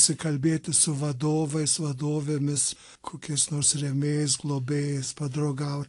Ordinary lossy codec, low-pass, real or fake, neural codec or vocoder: AAC, 48 kbps; 10.8 kHz; real; none